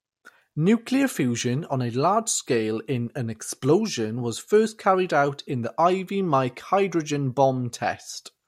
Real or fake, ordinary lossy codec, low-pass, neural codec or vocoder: real; MP3, 64 kbps; 19.8 kHz; none